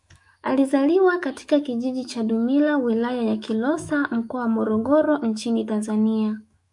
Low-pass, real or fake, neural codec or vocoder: 10.8 kHz; fake; autoencoder, 48 kHz, 128 numbers a frame, DAC-VAE, trained on Japanese speech